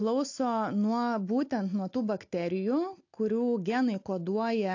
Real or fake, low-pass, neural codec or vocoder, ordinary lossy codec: real; 7.2 kHz; none; AAC, 48 kbps